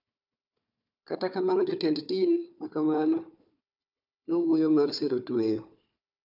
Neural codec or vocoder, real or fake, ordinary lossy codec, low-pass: codec, 16 kHz, 4 kbps, FunCodec, trained on Chinese and English, 50 frames a second; fake; none; 5.4 kHz